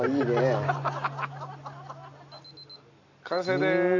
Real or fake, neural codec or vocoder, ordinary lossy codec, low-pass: real; none; AAC, 48 kbps; 7.2 kHz